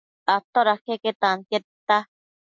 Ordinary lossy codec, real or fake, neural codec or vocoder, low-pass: MP3, 64 kbps; real; none; 7.2 kHz